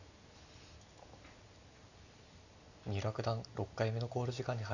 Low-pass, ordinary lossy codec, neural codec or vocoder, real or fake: 7.2 kHz; none; none; real